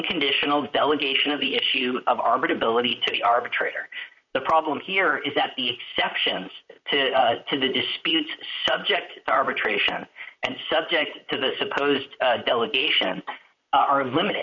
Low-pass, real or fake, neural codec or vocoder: 7.2 kHz; fake; vocoder, 44.1 kHz, 128 mel bands, Pupu-Vocoder